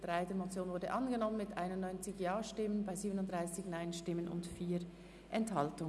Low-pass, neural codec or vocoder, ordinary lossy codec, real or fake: none; none; none; real